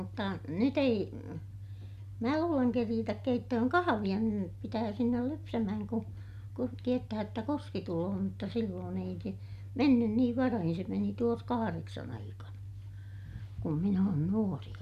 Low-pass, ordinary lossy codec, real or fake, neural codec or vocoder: 14.4 kHz; MP3, 96 kbps; real; none